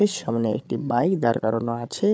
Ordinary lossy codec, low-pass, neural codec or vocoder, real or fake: none; none; codec, 16 kHz, 16 kbps, FreqCodec, larger model; fake